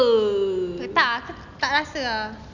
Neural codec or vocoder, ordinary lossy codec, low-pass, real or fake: none; none; 7.2 kHz; real